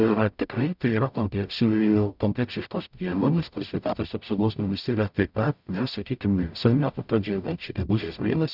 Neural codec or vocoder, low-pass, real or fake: codec, 44.1 kHz, 0.9 kbps, DAC; 5.4 kHz; fake